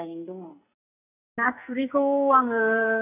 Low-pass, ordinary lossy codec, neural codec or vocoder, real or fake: 3.6 kHz; none; codec, 44.1 kHz, 2.6 kbps, SNAC; fake